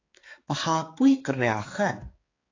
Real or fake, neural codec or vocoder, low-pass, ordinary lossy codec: fake; codec, 16 kHz, 4 kbps, X-Codec, HuBERT features, trained on balanced general audio; 7.2 kHz; AAC, 32 kbps